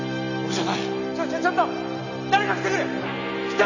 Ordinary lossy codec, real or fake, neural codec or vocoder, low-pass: none; real; none; 7.2 kHz